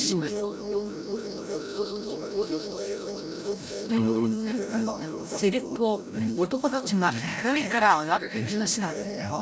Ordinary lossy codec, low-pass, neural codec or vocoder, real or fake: none; none; codec, 16 kHz, 0.5 kbps, FreqCodec, larger model; fake